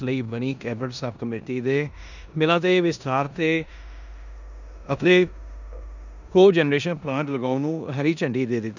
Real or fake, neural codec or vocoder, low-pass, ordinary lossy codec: fake; codec, 16 kHz in and 24 kHz out, 0.9 kbps, LongCat-Audio-Codec, four codebook decoder; 7.2 kHz; none